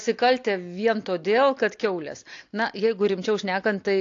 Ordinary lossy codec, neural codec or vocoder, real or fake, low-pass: AAC, 48 kbps; none; real; 7.2 kHz